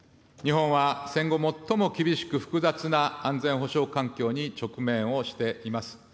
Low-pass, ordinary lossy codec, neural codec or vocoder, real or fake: none; none; none; real